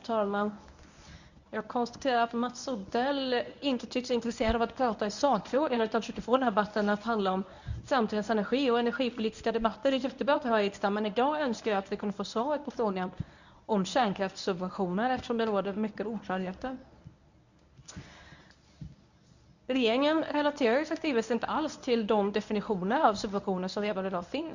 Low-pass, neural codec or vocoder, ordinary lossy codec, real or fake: 7.2 kHz; codec, 24 kHz, 0.9 kbps, WavTokenizer, medium speech release version 1; none; fake